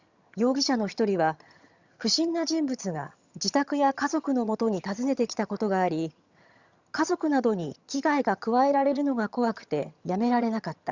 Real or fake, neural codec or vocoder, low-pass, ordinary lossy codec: fake; vocoder, 22.05 kHz, 80 mel bands, HiFi-GAN; 7.2 kHz; Opus, 64 kbps